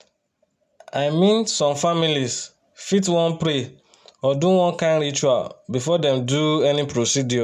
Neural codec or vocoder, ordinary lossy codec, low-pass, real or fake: none; none; 14.4 kHz; real